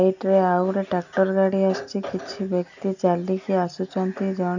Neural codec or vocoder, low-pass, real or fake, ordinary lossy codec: none; 7.2 kHz; real; none